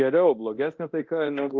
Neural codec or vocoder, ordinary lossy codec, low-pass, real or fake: codec, 24 kHz, 0.9 kbps, DualCodec; Opus, 32 kbps; 7.2 kHz; fake